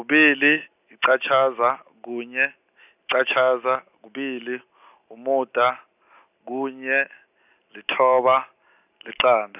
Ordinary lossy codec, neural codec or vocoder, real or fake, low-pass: none; none; real; 3.6 kHz